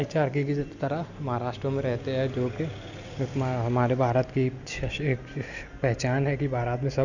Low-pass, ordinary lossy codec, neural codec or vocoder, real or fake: 7.2 kHz; none; none; real